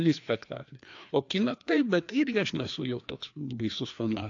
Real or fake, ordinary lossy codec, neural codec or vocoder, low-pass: fake; AAC, 48 kbps; codec, 16 kHz, 2 kbps, FreqCodec, larger model; 7.2 kHz